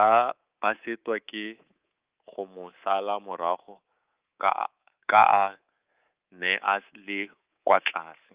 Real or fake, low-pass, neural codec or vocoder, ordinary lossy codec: fake; 3.6 kHz; codec, 24 kHz, 3.1 kbps, DualCodec; Opus, 32 kbps